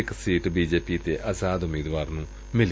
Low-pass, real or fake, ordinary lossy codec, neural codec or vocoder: none; real; none; none